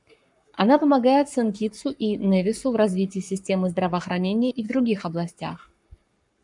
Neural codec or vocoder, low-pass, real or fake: codec, 44.1 kHz, 7.8 kbps, Pupu-Codec; 10.8 kHz; fake